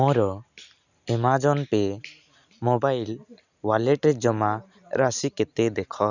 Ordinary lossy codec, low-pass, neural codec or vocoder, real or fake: none; 7.2 kHz; none; real